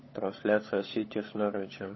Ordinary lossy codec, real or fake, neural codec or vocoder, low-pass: MP3, 24 kbps; fake; codec, 16 kHz, 16 kbps, FunCodec, trained on LibriTTS, 50 frames a second; 7.2 kHz